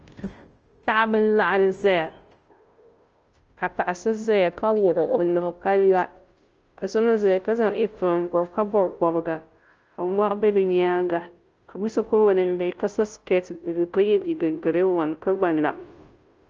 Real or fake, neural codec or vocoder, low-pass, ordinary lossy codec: fake; codec, 16 kHz, 0.5 kbps, FunCodec, trained on Chinese and English, 25 frames a second; 7.2 kHz; Opus, 24 kbps